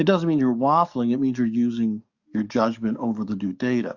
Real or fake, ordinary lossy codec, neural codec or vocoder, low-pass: fake; Opus, 64 kbps; codec, 44.1 kHz, 7.8 kbps, DAC; 7.2 kHz